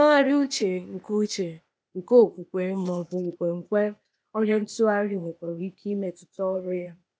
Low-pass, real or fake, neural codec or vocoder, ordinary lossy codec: none; fake; codec, 16 kHz, 0.8 kbps, ZipCodec; none